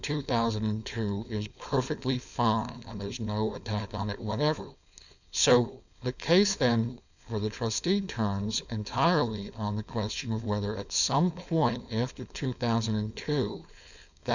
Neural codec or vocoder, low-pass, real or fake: codec, 16 kHz, 4.8 kbps, FACodec; 7.2 kHz; fake